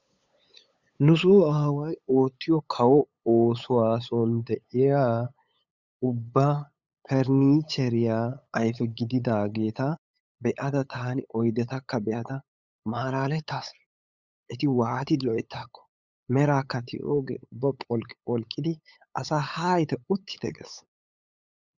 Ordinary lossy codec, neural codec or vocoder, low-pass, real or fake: Opus, 64 kbps; codec, 16 kHz, 8 kbps, FunCodec, trained on LibriTTS, 25 frames a second; 7.2 kHz; fake